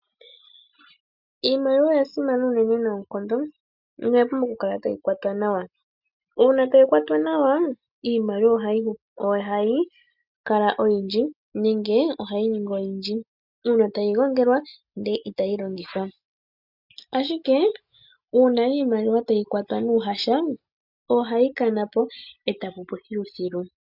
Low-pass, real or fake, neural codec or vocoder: 5.4 kHz; real; none